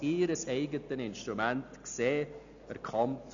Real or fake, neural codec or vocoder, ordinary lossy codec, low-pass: real; none; none; 7.2 kHz